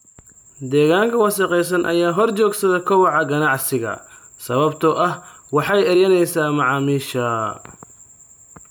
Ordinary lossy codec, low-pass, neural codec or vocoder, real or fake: none; none; none; real